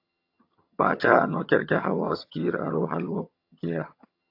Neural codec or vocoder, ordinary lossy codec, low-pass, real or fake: vocoder, 22.05 kHz, 80 mel bands, HiFi-GAN; AAC, 32 kbps; 5.4 kHz; fake